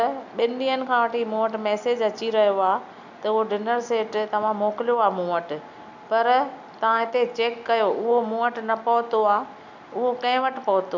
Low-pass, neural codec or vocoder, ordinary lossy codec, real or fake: 7.2 kHz; none; none; real